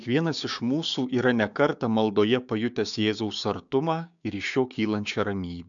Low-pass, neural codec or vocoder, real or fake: 7.2 kHz; codec, 16 kHz, 6 kbps, DAC; fake